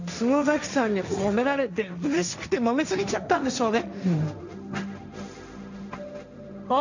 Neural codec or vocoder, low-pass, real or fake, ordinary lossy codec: codec, 16 kHz, 1.1 kbps, Voila-Tokenizer; 7.2 kHz; fake; none